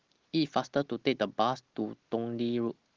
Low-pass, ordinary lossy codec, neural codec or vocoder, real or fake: 7.2 kHz; Opus, 16 kbps; none; real